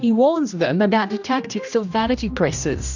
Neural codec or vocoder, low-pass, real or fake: codec, 16 kHz, 1 kbps, X-Codec, HuBERT features, trained on general audio; 7.2 kHz; fake